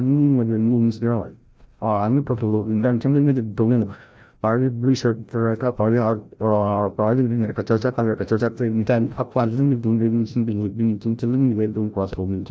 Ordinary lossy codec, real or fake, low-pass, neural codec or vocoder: none; fake; none; codec, 16 kHz, 0.5 kbps, FreqCodec, larger model